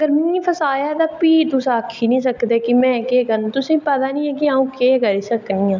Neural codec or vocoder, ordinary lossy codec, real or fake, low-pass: none; none; real; 7.2 kHz